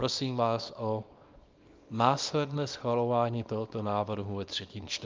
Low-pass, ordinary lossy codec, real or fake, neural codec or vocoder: 7.2 kHz; Opus, 24 kbps; fake; codec, 24 kHz, 0.9 kbps, WavTokenizer, small release